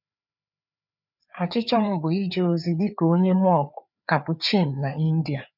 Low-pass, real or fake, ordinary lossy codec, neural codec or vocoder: 5.4 kHz; fake; none; codec, 16 kHz, 4 kbps, FreqCodec, larger model